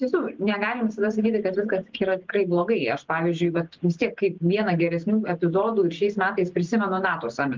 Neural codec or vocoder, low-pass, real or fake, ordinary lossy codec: none; 7.2 kHz; real; Opus, 32 kbps